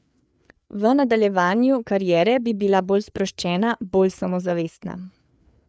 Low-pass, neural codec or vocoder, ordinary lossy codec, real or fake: none; codec, 16 kHz, 4 kbps, FreqCodec, larger model; none; fake